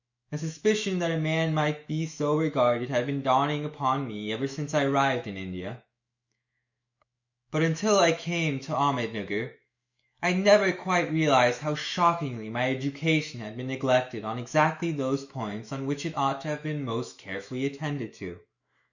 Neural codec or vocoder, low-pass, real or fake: autoencoder, 48 kHz, 128 numbers a frame, DAC-VAE, trained on Japanese speech; 7.2 kHz; fake